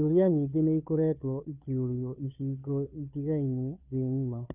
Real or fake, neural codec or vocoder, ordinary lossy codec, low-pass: fake; codec, 16 kHz, 2 kbps, FunCodec, trained on Chinese and English, 25 frames a second; none; 3.6 kHz